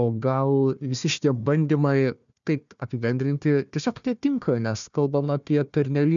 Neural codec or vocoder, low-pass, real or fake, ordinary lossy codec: codec, 16 kHz, 1 kbps, FunCodec, trained on Chinese and English, 50 frames a second; 7.2 kHz; fake; MP3, 96 kbps